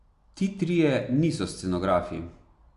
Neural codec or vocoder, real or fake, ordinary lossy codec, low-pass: none; real; Opus, 64 kbps; 10.8 kHz